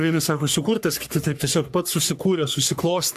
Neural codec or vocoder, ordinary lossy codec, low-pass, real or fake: codec, 44.1 kHz, 3.4 kbps, Pupu-Codec; AAC, 96 kbps; 14.4 kHz; fake